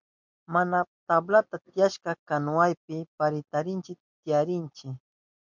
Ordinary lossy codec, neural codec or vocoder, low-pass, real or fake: MP3, 64 kbps; none; 7.2 kHz; real